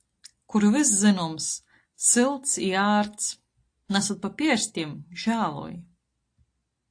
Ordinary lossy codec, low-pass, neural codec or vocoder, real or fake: AAC, 48 kbps; 9.9 kHz; none; real